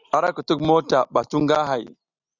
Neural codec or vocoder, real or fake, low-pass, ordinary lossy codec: none; real; 7.2 kHz; Opus, 64 kbps